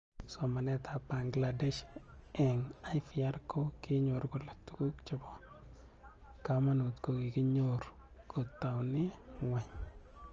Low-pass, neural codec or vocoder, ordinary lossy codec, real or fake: 7.2 kHz; none; Opus, 24 kbps; real